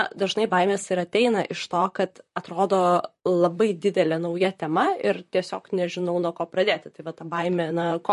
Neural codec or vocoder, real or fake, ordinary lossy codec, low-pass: vocoder, 44.1 kHz, 128 mel bands, Pupu-Vocoder; fake; MP3, 48 kbps; 14.4 kHz